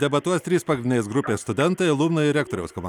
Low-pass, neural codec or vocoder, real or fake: 19.8 kHz; none; real